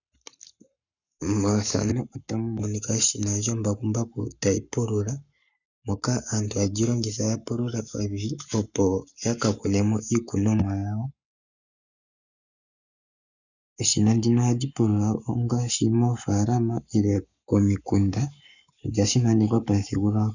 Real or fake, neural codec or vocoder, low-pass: fake; codec, 44.1 kHz, 7.8 kbps, Pupu-Codec; 7.2 kHz